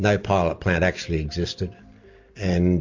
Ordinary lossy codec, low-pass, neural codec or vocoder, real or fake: MP3, 48 kbps; 7.2 kHz; none; real